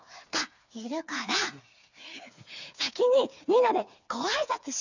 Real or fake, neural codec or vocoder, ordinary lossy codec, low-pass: fake; codec, 16 kHz, 4 kbps, FreqCodec, smaller model; none; 7.2 kHz